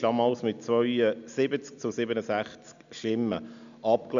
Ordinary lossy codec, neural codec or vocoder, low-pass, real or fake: AAC, 96 kbps; none; 7.2 kHz; real